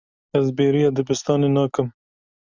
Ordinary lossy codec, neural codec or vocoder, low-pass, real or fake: Opus, 64 kbps; none; 7.2 kHz; real